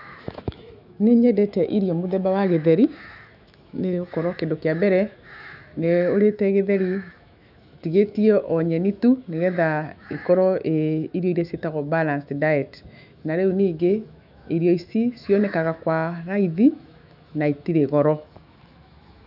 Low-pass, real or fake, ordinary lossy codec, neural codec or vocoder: 5.4 kHz; fake; none; autoencoder, 48 kHz, 128 numbers a frame, DAC-VAE, trained on Japanese speech